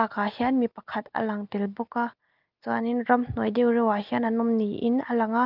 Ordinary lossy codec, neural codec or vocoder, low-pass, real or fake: Opus, 32 kbps; none; 5.4 kHz; real